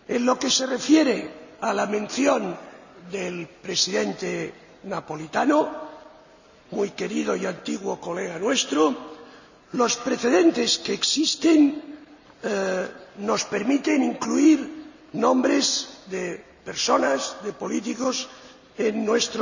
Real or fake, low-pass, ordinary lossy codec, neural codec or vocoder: real; 7.2 kHz; none; none